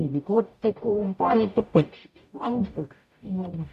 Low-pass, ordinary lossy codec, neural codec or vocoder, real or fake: 14.4 kHz; none; codec, 44.1 kHz, 0.9 kbps, DAC; fake